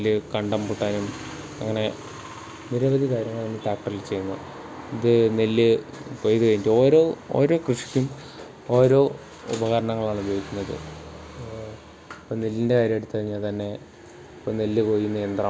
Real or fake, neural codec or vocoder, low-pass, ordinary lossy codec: real; none; none; none